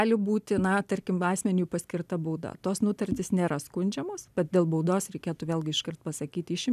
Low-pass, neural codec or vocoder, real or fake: 14.4 kHz; none; real